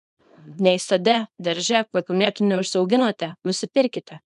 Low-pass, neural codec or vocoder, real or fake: 10.8 kHz; codec, 24 kHz, 0.9 kbps, WavTokenizer, small release; fake